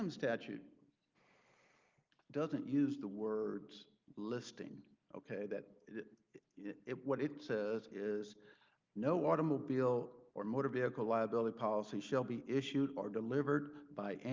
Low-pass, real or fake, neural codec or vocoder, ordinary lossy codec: 7.2 kHz; real; none; Opus, 24 kbps